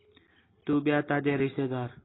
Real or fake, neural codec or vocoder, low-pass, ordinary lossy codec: real; none; 7.2 kHz; AAC, 16 kbps